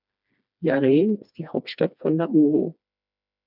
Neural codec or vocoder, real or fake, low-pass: codec, 16 kHz, 2 kbps, FreqCodec, smaller model; fake; 5.4 kHz